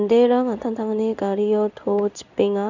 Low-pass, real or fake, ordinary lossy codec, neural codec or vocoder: 7.2 kHz; fake; none; codec, 16 kHz in and 24 kHz out, 1 kbps, XY-Tokenizer